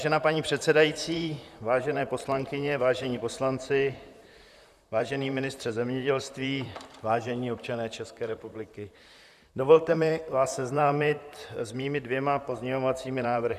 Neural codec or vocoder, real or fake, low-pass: vocoder, 44.1 kHz, 128 mel bands, Pupu-Vocoder; fake; 14.4 kHz